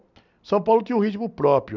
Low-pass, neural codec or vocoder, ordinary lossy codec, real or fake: 7.2 kHz; none; none; real